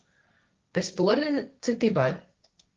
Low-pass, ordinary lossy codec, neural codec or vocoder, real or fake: 7.2 kHz; Opus, 32 kbps; codec, 16 kHz, 1.1 kbps, Voila-Tokenizer; fake